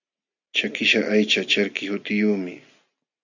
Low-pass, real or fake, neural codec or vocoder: 7.2 kHz; real; none